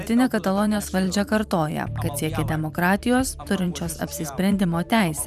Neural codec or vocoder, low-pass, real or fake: vocoder, 44.1 kHz, 128 mel bands every 512 samples, BigVGAN v2; 14.4 kHz; fake